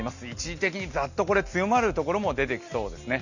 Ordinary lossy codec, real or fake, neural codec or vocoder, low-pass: none; real; none; 7.2 kHz